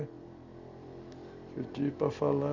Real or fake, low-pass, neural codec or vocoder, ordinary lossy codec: real; 7.2 kHz; none; none